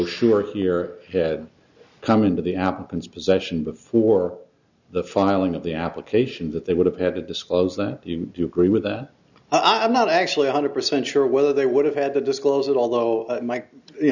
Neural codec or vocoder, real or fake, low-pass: none; real; 7.2 kHz